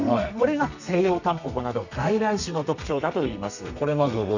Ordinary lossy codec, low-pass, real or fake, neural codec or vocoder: none; 7.2 kHz; fake; codec, 32 kHz, 1.9 kbps, SNAC